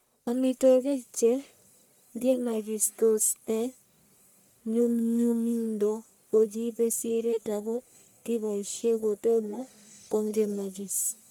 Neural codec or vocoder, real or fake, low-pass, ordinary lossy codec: codec, 44.1 kHz, 1.7 kbps, Pupu-Codec; fake; none; none